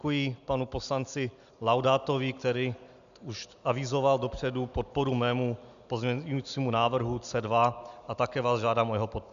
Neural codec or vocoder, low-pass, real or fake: none; 7.2 kHz; real